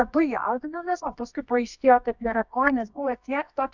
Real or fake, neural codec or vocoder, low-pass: fake; codec, 24 kHz, 0.9 kbps, WavTokenizer, medium music audio release; 7.2 kHz